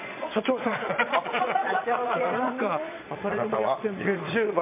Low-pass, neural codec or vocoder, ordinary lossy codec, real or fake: 3.6 kHz; vocoder, 22.05 kHz, 80 mel bands, Vocos; MP3, 32 kbps; fake